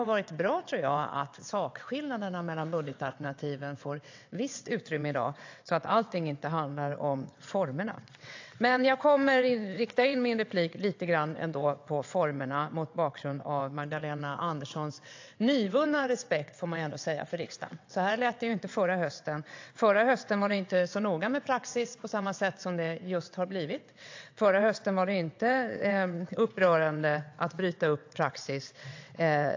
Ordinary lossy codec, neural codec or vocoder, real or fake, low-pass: AAC, 48 kbps; vocoder, 22.05 kHz, 80 mel bands, WaveNeXt; fake; 7.2 kHz